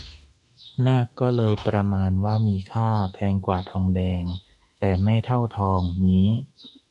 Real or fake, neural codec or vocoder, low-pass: fake; autoencoder, 48 kHz, 32 numbers a frame, DAC-VAE, trained on Japanese speech; 10.8 kHz